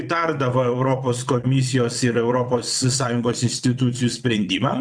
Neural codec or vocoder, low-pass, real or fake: vocoder, 22.05 kHz, 80 mel bands, WaveNeXt; 9.9 kHz; fake